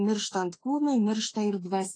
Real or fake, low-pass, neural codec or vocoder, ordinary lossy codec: fake; 9.9 kHz; autoencoder, 48 kHz, 32 numbers a frame, DAC-VAE, trained on Japanese speech; AAC, 32 kbps